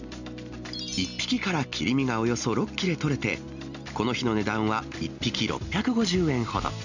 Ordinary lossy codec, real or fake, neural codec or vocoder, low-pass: none; real; none; 7.2 kHz